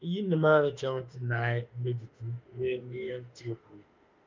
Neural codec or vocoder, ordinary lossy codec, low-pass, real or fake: autoencoder, 48 kHz, 32 numbers a frame, DAC-VAE, trained on Japanese speech; Opus, 24 kbps; 7.2 kHz; fake